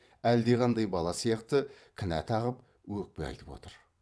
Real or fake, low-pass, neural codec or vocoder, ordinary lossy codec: fake; none; vocoder, 22.05 kHz, 80 mel bands, WaveNeXt; none